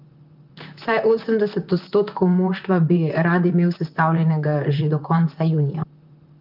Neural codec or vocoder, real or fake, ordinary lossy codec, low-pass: vocoder, 44.1 kHz, 128 mel bands, Pupu-Vocoder; fake; Opus, 24 kbps; 5.4 kHz